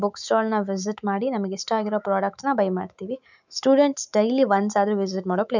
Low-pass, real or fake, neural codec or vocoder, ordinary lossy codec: 7.2 kHz; fake; autoencoder, 48 kHz, 128 numbers a frame, DAC-VAE, trained on Japanese speech; none